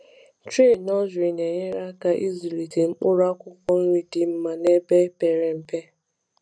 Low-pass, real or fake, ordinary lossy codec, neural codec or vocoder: 9.9 kHz; real; none; none